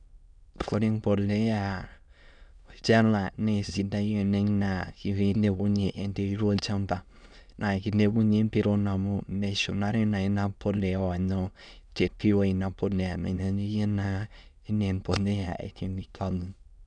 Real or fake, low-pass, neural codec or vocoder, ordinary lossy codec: fake; 9.9 kHz; autoencoder, 22.05 kHz, a latent of 192 numbers a frame, VITS, trained on many speakers; none